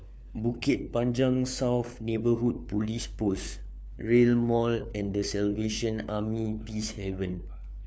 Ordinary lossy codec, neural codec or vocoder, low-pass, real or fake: none; codec, 16 kHz, 4 kbps, FunCodec, trained on LibriTTS, 50 frames a second; none; fake